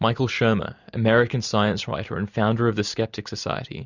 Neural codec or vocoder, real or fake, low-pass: none; real; 7.2 kHz